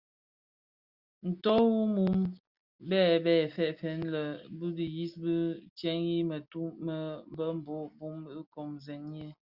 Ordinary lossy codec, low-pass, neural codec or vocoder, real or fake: Opus, 64 kbps; 5.4 kHz; none; real